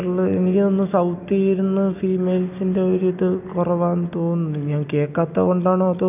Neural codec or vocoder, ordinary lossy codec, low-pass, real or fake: none; none; 3.6 kHz; real